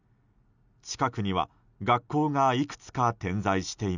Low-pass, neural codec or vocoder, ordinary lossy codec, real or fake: 7.2 kHz; none; none; real